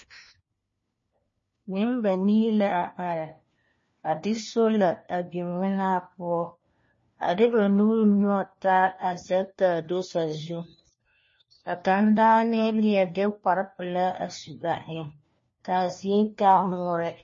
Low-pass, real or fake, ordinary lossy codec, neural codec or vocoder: 7.2 kHz; fake; MP3, 32 kbps; codec, 16 kHz, 1 kbps, FunCodec, trained on LibriTTS, 50 frames a second